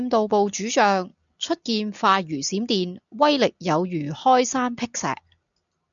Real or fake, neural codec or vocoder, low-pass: real; none; 7.2 kHz